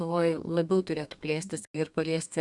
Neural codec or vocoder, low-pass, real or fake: codec, 32 kHz, 1.9 kbps, SNAC; 10.8 kHz; fake